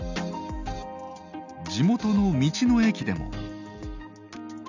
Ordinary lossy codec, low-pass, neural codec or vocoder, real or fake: none; 7.2 kHz; none; real